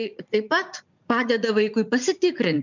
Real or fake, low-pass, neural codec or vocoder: fake; 7.2 kHz; vocoder, 44.1 kHz, 80 mel bands, Vocos